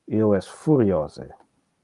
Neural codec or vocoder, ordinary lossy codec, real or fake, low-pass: none; Opus, 32 kbps; real; 10.8 kHz